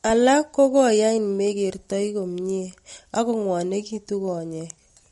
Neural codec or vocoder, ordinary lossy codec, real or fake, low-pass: none; MP3, 48 kbps; real; 19.8 kHz